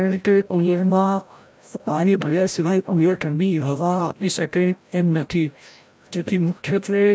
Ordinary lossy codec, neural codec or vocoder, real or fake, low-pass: none; codec, 16 kHz, 0.5 kbps, FreqCodec, larger model; fake; none